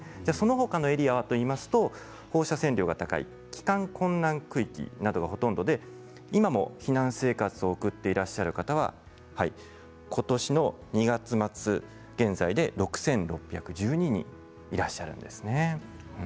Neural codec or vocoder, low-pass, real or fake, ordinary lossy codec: none; none; real; none